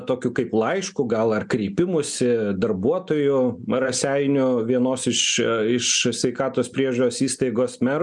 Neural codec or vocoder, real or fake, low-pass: none; real; 10.8 kHz